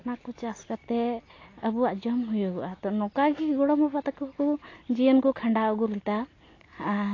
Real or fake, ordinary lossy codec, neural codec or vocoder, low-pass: real; AAC, 32 kbps; none; 7.2 kHz